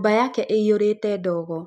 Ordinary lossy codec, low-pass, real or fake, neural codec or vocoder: none; 14.4 kHz; real; none